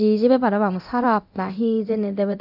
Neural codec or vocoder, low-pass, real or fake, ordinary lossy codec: codec, 24 kHz, 0.9 kbps, DualCodec; 5.4 kHz; fake; none